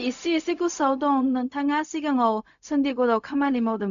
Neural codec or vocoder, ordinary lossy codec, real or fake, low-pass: codec, 16 kHz, 0.4 kbps, LongCat-Audio-Codec; none; fake; 7.2 kHz